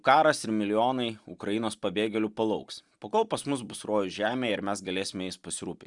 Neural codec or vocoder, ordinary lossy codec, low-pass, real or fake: none; Opus, 64 kbps; 10.8 kHz; real